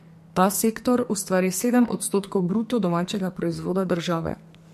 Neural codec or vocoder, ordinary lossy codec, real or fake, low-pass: codec, 44.1 kHz, 2.6 kbps, SNAC; MP3, 64 kbps; fake; 14.4 kHz